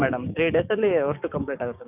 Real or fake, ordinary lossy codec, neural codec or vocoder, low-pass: fake; none; codec, 16 kHz, 6 kbps, DAC; 3.6 kHz